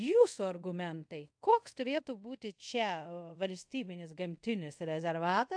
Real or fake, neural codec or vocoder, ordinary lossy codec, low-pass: fake; codec, 24 kHz, 0.5 kbps, DualCodec; AAC, 64 kbps; 9.9 kHz